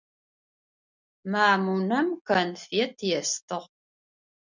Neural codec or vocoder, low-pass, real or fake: none; 7.2 kHz; real